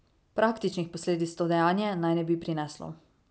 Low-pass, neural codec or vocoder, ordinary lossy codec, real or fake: none; none; none; real